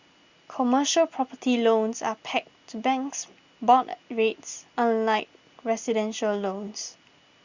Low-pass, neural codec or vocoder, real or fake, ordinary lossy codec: 7.2 kHz; none; real; Opus, 64 kbps